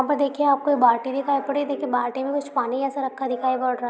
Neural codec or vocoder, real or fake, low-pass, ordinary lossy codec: none; real; none; none